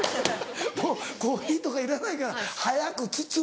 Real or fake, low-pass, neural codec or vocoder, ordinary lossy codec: real; none; none; none